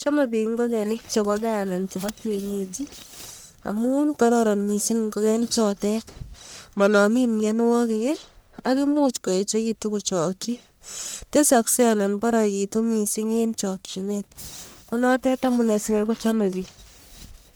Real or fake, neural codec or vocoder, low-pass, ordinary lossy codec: fake; codec, 44.1 kHz, 1.7 kbps, Pupu-Codec; none; none